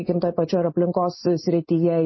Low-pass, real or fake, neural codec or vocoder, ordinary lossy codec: 7.2 kHz; real; none; MP3, 24 kbps